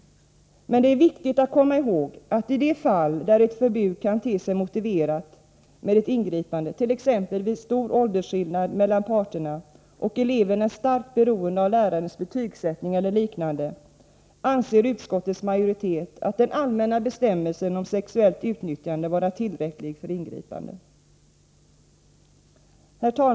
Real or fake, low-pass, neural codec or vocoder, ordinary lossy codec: real; none; none; none